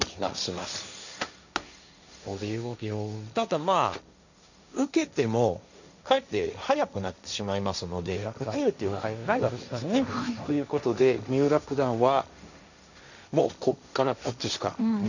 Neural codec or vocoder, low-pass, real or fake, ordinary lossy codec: codec, 16 kHz, 1.1 kbps, Voila-Tokenizer; 7.2 kHz; fake; none